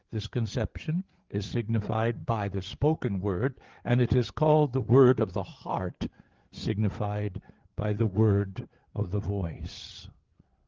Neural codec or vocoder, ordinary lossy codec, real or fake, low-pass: codec, 16 kHz, 8 kbps, FreqCodec, larger model; Opus, 16 kbps; fake; 7.2 kHz